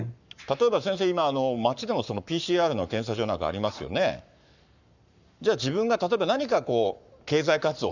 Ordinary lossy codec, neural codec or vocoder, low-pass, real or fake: none; codec, 44.1 kHz, 7.8 kbps, Pupu-Codec; 7.2 kHz; fake